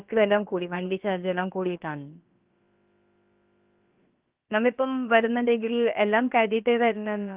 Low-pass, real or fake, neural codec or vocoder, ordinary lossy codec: 3.6 kHz; fake; codec, 16 kHz, about 1 kbps, DyCAST, with the encoder's durations; Opus, 32 kbps